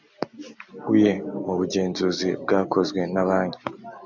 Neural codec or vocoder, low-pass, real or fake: none; 7.2 kHz; real